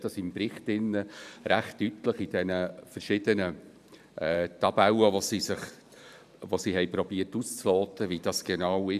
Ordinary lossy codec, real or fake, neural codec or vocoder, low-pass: none; fake; vocoder, 44.1 kHz, 128 mel bands every 512 samples, BigVGAN v2; 14.4 kHz